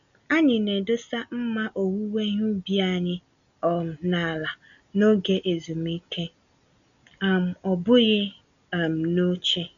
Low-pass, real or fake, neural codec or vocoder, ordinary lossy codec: 7.2 kHz; real; none; none